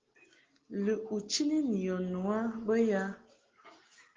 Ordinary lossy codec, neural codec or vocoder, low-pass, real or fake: Opus, 16 kbps; none; 7.2 kHz; real